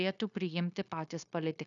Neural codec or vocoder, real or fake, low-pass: codec, 16 kHz, 0.9 kbps, LongCat-Audio-Codec; fake; 7.2 kHz